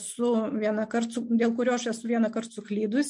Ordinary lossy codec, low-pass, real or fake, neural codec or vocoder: MP3, 64 kbps; 10.8 kHz; real; none